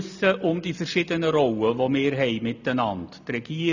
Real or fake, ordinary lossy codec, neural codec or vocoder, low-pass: real; none; none; 7.2 kHz